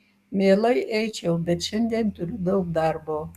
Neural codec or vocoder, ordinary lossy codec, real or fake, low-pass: codec, 44.1 kHz, 7.8 kbps, DAC; Opus, 64 kbps; fake; 14.4 kHz